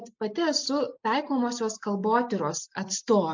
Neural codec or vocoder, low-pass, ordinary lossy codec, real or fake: none; 7.2 kHz; MP3, 48 kbps; real